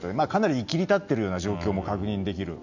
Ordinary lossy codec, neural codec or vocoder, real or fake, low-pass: none; none; real; 7.2 kHz